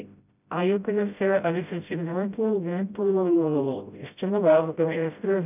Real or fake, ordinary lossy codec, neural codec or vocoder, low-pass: fake; none; codec, 16 kHz, 0.5 kbps, FreqCodec, smaller model; 3.6 kHz